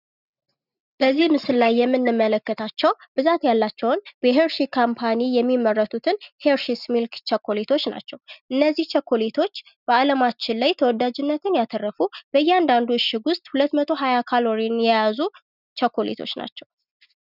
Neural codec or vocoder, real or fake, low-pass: none; real; 5.4 kHz